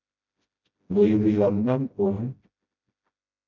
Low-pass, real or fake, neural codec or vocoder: 7.2 kHz; fake; codec, 16 kHz, 0.5 kbps, FreqCodec, smaller model